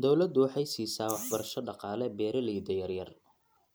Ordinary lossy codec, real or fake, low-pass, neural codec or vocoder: none; real; none; none